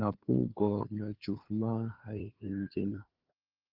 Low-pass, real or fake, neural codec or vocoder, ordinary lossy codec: 5.4 kHz; fake; codec, 16 kHz, 2 kbps, FunCodec, trained on LibriTTS, 25 frames a second; Opus, 32 kbps